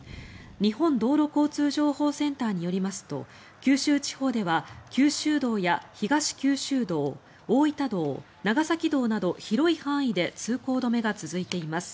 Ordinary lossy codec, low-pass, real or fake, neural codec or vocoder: none; none; real; none